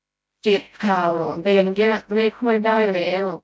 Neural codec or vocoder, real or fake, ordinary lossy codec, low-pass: codec, 16 kHz, 0.5 kbps, FreqCodec, smaller model; fake; none; none